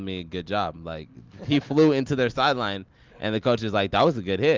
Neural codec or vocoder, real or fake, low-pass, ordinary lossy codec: none; real; 7.2 kHz; Opus, 32 kbps